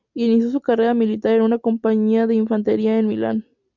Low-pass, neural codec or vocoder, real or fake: 7.2 kHz; none; real